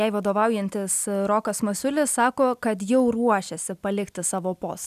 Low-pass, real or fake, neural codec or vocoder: 14.4 kHz; real; none